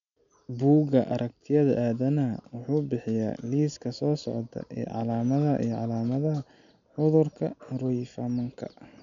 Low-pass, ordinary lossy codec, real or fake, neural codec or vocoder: 7.2 kHz; none; real; none